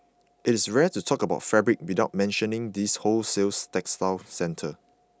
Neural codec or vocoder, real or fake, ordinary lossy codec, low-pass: none; real; none; none